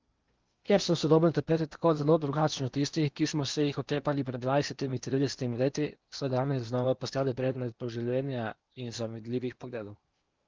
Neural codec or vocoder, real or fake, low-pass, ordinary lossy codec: codec, 16 kHz in and 24 kHz out, 0.8 kbps, FocalCodec, streaming, 65536 codes; fake; 7.2 kHz; Opus, 32 kbps